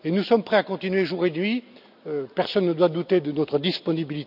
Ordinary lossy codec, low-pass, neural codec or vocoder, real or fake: none; 5.4 kHz; none; real